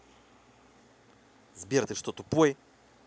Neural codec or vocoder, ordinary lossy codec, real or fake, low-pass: none; none; real; none